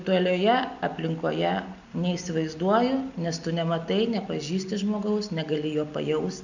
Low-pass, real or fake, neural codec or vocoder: 7.2 kHz; real; none